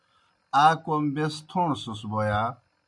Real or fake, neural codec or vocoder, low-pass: real; none; 10.8 kHz